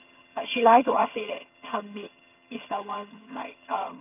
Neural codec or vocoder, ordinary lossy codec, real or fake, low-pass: vocoder, 22.05 kHz, 80 mel bands, HiFi-GAN; none; fake; 3.6 kHz